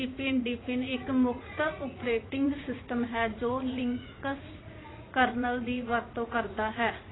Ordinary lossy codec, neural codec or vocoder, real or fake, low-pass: AAC, 16 kbps; vocoder, 44.1 kHz, 80 mel bands, Vocos; fake; 7.2 kHz